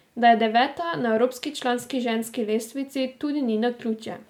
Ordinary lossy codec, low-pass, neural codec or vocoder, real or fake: MP3, 96 kbps; 19.8 kHz; none; real